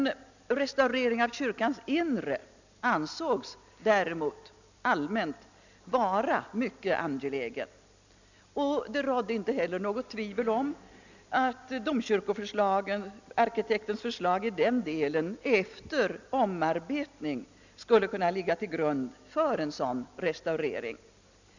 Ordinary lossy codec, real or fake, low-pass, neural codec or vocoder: none; real; 7.2 kHz; none